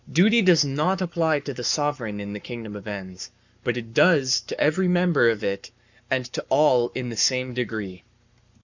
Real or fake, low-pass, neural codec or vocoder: fake; 7.2 kHz; codec, 44.1 kHz, 7.8 kbps, Pupu-Codec